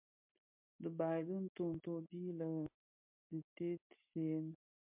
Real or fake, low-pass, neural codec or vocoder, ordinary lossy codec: real; 3.6 kHz; none; MP3, 32 kbps